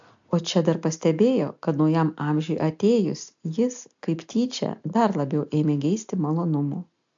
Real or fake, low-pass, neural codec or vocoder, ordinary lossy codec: real; 7.2 kHz; none; AAC, 64 kbps